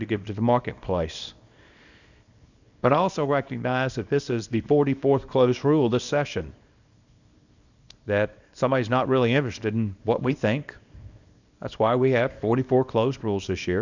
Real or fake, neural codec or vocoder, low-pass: fake; codec, 24 kHz, 0.9 kbps, WavTokenizer, small release; 7.2 kHz